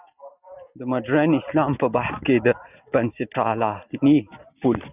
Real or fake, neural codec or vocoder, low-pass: real; none; 3.6 kHz